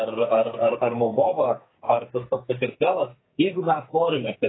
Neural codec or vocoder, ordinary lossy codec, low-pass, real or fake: codec, 44.1 kHz, 2.6 kbps, SNAC; AAC, 16 kbps; 7.2 kHz; fake